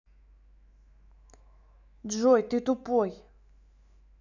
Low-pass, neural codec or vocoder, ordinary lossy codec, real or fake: 7.2 kHz; autoencoder, 48 kHz, 128 numbers a frame, DAC-VAE, trained on Japanese speech; none; fake